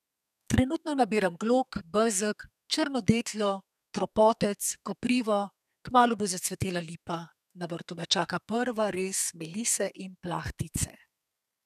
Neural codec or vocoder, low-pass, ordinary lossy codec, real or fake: codec, 32 kHz, 1.9 kbps, SNAC; 14.4 kHz; none; fake